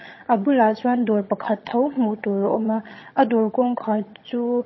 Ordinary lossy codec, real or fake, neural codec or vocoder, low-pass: MP3, 24 kbps; fake; vocoder, 22.05 kHz, 80 mel bands, HiFi-GAN; 7.2 kHz